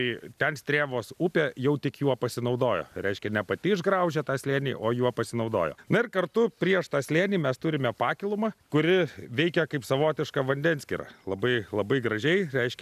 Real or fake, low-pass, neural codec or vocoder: real; 14.4 kHz; none